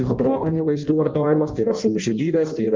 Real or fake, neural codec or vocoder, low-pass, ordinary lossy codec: fake; codec, 16 kHz in and 24 kHz out, 0.6 kbps, FireRedTTS-2 codec; 7.2 kHz; Opus, 24 kbps